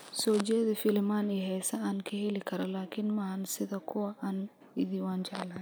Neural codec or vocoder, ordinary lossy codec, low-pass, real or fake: none; none; none; real